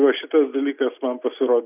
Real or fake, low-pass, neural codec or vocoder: real; 3.6 kHz; none